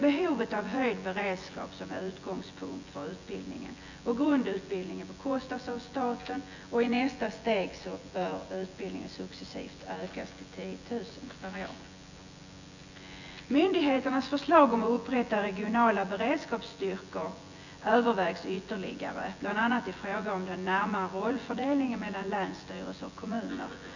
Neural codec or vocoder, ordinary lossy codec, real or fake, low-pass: vocoder, 24 kHz, 100 mel bands, Vocos; none; fake; 7.2 kHz